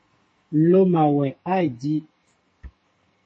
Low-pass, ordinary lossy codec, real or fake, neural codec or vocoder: 9.9 kHz; MP3, 32 kbps; fake; codec, 44.1 kHz, 2.6 kbps, SNAC